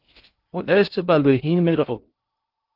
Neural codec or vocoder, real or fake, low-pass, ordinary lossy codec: codec, 16 kHz in and 24 kHz out, 0.6 kbps, FocalCodec, streaming, 2048 codes; fake; 5.4 kHz; Opus, 24 kbps